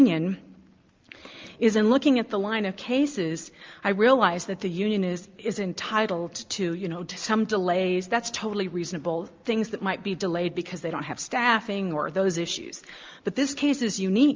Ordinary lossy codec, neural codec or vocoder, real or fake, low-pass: Opus, 24 kbps; none; real; 7.2 kHz